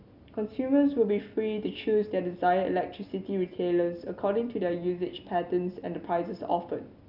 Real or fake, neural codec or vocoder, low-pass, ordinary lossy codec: real; none; 5.4 kHz; none